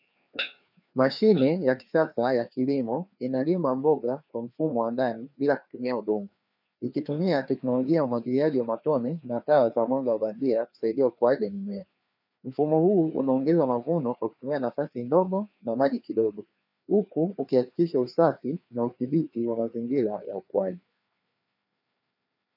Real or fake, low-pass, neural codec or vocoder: fake; 5.4 kHz; codec, 16 kHz, 2 kbps, FreqCodec, larger model